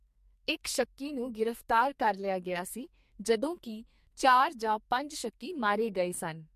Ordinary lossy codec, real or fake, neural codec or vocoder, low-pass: MP3, 64 kbps; fake; codec, 44.1 kHz, 2.6 kbps, SNAC; 14.4 kHz